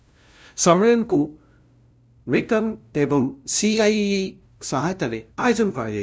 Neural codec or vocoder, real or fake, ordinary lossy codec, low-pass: codec, 16 kHz, 0.5 kbps, FunCodec, trained on LibriTTS, 25 frames a second; fake; none; none